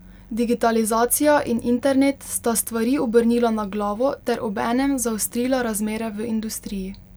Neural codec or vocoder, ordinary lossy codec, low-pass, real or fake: none; none; none; real